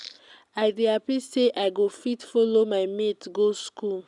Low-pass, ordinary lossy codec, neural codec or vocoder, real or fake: 10.8 kHz; none; none; real